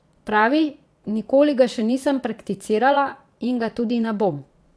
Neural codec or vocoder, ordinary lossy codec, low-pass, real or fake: vocoder, 22.05 kHz, 80 mel bands, Vocos; none; none; fake